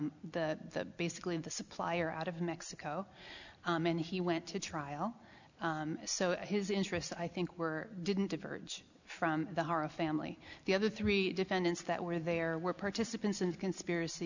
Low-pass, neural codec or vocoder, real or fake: 7.2 kHz; none; real